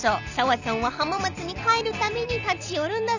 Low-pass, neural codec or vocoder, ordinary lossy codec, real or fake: 7.2 kHz; none; none; real